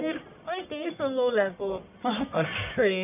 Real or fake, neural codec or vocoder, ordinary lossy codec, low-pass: fake; codec, 44.1 kHz, 1.7 kbps, Pupu-Codec; none; 3.6 kHz